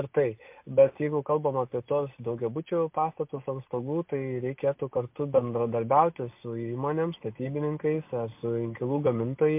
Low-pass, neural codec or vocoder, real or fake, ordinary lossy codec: 3.6 kHz; none; real; MP3, 32 kbps